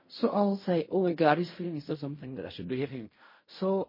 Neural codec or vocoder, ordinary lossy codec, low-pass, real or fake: codec, 16 kHz in and 24 kHz out, 0.4 kbps, LongCat-Audio-Codec, fine tuned four codebook decoder; MP3, 24 kbps; 5.4 kHz; fake